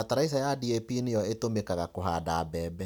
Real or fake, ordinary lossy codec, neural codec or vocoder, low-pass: real; none; none; none